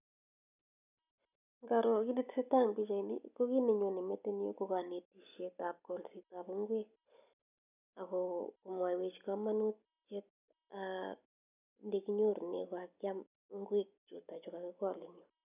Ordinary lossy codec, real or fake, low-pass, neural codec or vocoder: none; real; 3.6 kHz; none